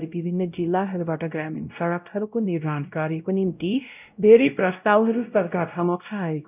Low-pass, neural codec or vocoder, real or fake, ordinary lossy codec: 3.6 kHz; codec, 16 kHz, 0.5 kbps, X-Codec, WavLM features, trained on Multilingual LibriSpeech; fake; none